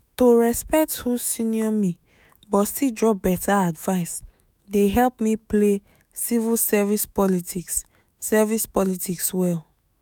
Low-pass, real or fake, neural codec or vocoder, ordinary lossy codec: none; fake; autoencoder, 48 kHz, 128 numbers a frame, DAC-VAE, trained on Japanese speech; none